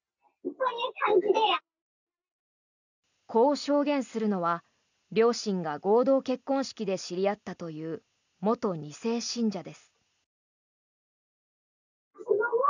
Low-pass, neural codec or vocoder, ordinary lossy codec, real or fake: 7.2 kHz; none; none; real